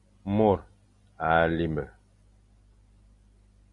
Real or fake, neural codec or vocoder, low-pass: real; none; 10.8 kHz